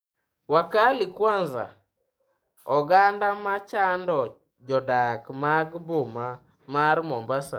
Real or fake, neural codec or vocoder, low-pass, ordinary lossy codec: fake; codec, 44.1 kHz, 7.8 kbps, DAC; none; none